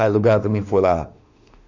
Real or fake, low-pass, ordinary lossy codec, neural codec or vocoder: fake; 7.2 kHz; none; autoencoder, 48 kHz, 32 numbers a frame, DAC-VAE, trained on Japanese speech